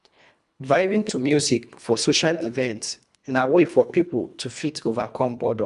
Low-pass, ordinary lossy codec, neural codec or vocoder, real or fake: 10.8 kHz; none; codec, 24 kHz, 1.5 kbps, HILCodec; fake